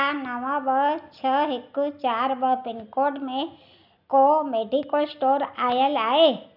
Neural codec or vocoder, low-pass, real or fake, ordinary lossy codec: none; 5.4 kHz; real; none